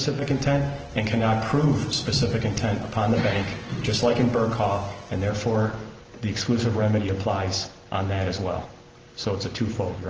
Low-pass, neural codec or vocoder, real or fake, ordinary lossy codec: 7.2 kHz; none; real; Opus, 16 kbps